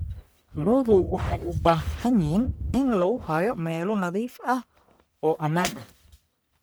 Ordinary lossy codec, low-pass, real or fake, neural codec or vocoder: none; none; fake; codec, 44.1 kHz, 1.7 kbps, Pupu-Codec